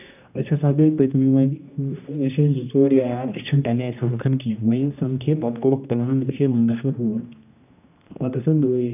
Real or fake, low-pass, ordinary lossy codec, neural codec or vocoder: fake; 3.6 kHz; none; codec, 16 kHz, 1 kbps, X-Codec, HuBERT features, trained on general audio